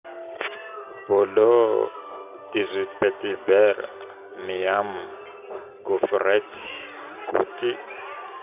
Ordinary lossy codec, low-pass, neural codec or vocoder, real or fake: none; 3.6 kHz; none; real